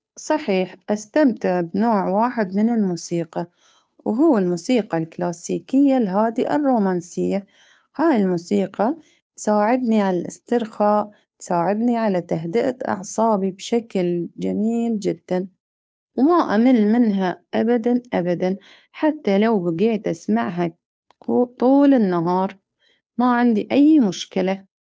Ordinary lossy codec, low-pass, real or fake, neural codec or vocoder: none; none; fake; codec, 16 kHz, 2 kbps, FunCodec, trained on Chinese and English, 25 frames a second